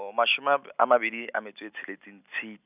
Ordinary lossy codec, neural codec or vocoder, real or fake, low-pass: none; none; real; 3.6 kHz